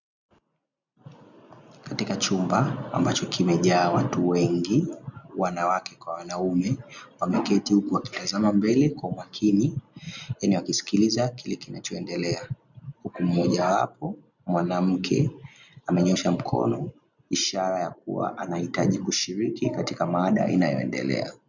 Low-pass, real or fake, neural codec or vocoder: 7.2 kHz; real; none